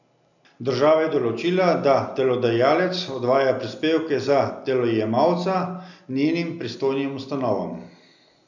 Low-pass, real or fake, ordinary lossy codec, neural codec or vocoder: 7.2 kHz; real; none; none